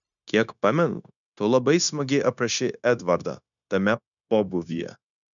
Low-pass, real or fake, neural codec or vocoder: 7.2 kHz; fake; codec, 16 kHz, 0.9 kbps, LongCat-Audio-Codec